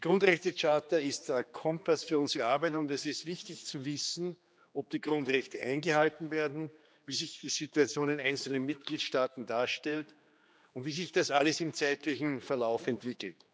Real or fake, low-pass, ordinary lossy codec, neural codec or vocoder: fake; none; none; codec, 16 kHz, 2 kbps, X-Codec, HuBERT features, trained on general audio